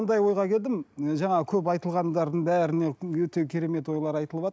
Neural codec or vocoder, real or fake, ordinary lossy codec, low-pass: none; real; none; none